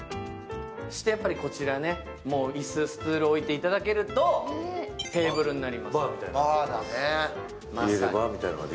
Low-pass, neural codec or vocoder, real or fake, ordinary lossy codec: none; none; real; none